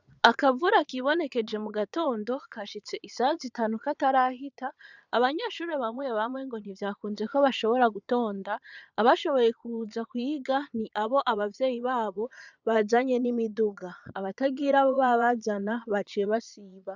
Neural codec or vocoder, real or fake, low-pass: vocoder, 22.05 kHz, 80 mel bands, Vocos; fake; 7.2 kHz